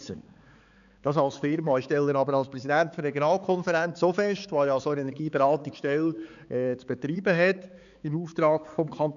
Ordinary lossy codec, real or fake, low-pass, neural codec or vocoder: none; fake; 7.2 kHz; codec, 16 kHz, 4 kbps, X-Codec, HuBERT features, trained on balanced general audio